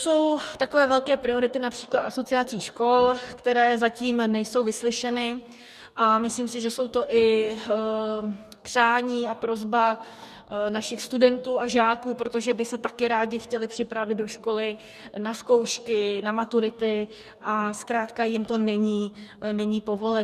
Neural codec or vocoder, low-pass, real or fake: codec, 44.1 kHz, 2.6 kbps, DAC; 14.4 kHz; fake